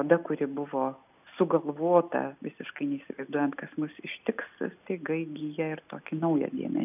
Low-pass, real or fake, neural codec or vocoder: 3.6 kHz; real; none